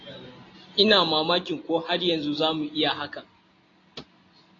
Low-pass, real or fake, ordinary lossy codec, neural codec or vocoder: 7.2 kHz; real; AAC, 32 kbps; none